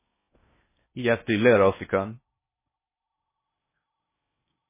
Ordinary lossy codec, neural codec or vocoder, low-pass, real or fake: MP3, 16 kbps; codec, 16 kHz in and 24 kHz out, 0.6 kbps, FocalCodec, streaming, 4096 codes; 3.6 kHz; fake